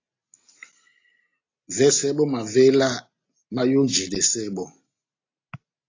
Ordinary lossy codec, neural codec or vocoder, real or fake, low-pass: AAC, 32 kbps; none; real; 7.2 kHz